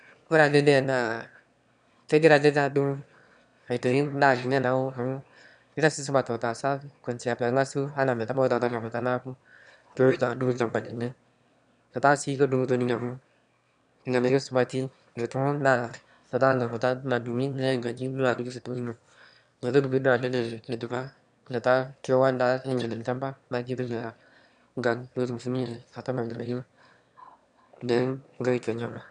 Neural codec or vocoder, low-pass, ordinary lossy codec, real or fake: autoencoder, 22.05 kHz, a latent of 192 numbers a frame, VITS, trained on one speaker; 9.9 kHz; none; fake